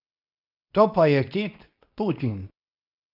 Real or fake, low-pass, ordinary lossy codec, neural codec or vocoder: fake; 5.4 kHz; none; codec, 24 kHz, 0.9 kbps, WavTokenizer, medium speech release version 2